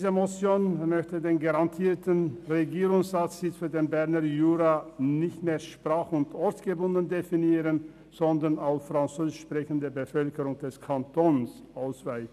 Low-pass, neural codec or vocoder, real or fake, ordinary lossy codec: 14.4 kHz; none; real; none